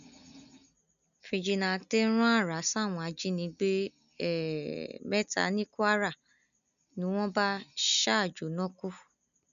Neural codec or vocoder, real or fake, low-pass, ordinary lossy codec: none; real; 7.2 kHz; none